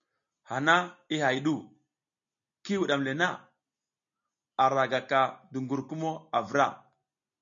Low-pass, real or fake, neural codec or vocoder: 7.2 kHz; real; none